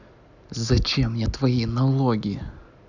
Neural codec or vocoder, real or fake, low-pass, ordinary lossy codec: none; real; 7.2 kHz; none